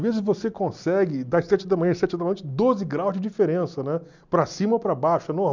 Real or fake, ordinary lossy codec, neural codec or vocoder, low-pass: real; none; none; 7.2 kHz